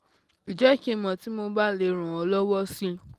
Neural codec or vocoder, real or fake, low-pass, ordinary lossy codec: none; real; 14.4 kHz; Opus, 24 kbps